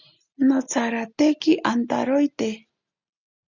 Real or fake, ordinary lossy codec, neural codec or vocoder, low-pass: real; Opus, 64 kbps; none; 7.2 kHz